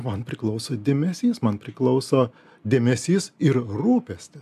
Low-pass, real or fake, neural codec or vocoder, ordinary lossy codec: 14.4 kHz; real; none; AAC, 96 kbps